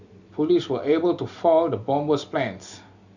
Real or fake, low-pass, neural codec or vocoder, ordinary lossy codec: real; 7.2 kHz; none; Opus, 64 kbps